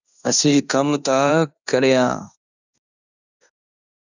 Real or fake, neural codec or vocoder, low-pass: fake; codec, 16 kHz in and 24 kHz out, 0.9 kbps, LongCat-Audio-Codec, fine tuned four codebook decoder; 7.2 kHz